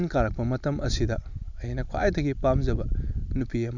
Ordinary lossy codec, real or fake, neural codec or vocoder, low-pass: none; real; none; 7.2 kHz